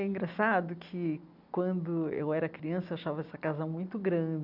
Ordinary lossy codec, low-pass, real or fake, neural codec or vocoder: none; 5.4 kHz; real; none